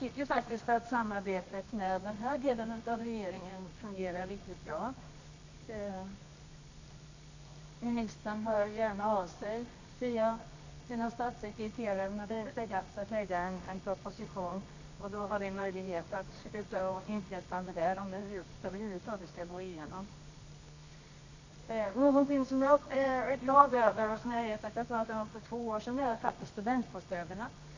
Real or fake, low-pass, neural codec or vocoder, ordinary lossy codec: fake; 7.2 kHz; codec, 24 kHz, 0.9 kbps, WavTokenizer, medium music audio release; AAC, 48 kbps